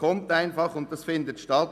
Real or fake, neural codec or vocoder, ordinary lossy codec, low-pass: real; none; none; 14.4 kHz